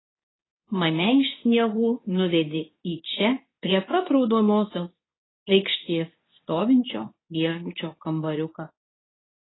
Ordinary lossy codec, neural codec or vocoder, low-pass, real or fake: AAC, 16 kbps; codec, 24 kHz, 0.9 kbps, WavTokenizer, medium speech release version 2; 7.2 kHz; fake